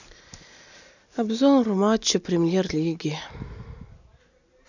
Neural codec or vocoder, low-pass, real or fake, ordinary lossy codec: none; 7.2 kHz; real; none